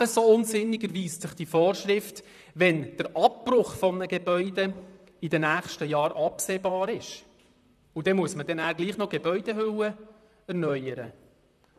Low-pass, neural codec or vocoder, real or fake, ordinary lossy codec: 14.4 kHz; vocoder, 44.1 kHz, 128 mel bands, Pupu-Vocoder; fake; none